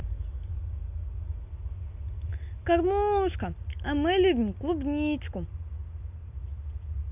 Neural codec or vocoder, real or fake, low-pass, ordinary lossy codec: none; real; 3.6 kHz; none